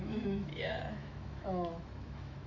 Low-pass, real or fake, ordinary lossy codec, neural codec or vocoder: 7.2 kHz; fake; AAC, 48 kbps; autoencoder, 48 kHz, 128 numbers a frame, DAC-VAE, trained on Japanese speech